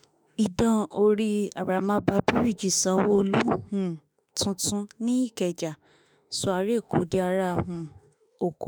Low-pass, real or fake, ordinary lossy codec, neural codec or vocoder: none; fake; none; autoencoder, 48 kHz, 32 numbers a frame, DAC-VAE, trained on Japanese speech